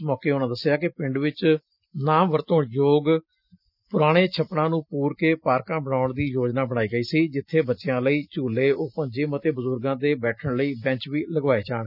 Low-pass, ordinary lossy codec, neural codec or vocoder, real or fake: 5.4 kHz; none; none; real